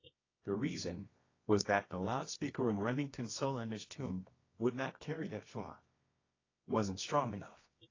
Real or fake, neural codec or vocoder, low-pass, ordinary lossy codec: fake; codec, 24 kHz, 0.9 kbps, WavTokenizer, medium music audio release; 7.2 kHz; AAC, 32 kbps